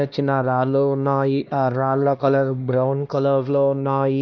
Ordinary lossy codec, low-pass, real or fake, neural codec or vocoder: none; 7.2 kHz; fake; codec, 16 kHz, 1 kbps, X-Codec, HuBERT features, trained on LibriSpeech